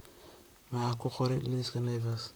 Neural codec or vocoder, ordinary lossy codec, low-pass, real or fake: vocoder, 44.1 kHz, 128 mel bands, Pupu-Vocoder; none; none; fake